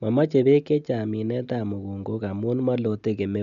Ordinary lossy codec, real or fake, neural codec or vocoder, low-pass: none; real; none; 7.2 kHz